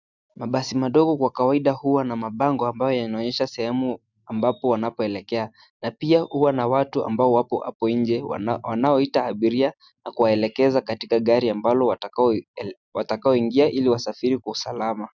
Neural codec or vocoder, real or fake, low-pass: none; real; 7.2 kHz